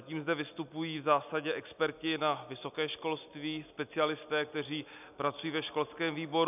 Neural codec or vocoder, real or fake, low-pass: none; real; 3.6 kHz